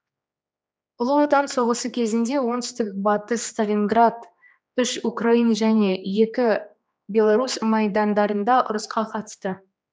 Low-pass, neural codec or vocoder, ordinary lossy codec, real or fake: none; codec, 16 kHz, 2 kbps, X-Codec, HuBERT features, trained on general audio; none; fake